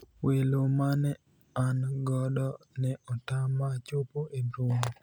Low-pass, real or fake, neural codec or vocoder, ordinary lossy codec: none; real; none; none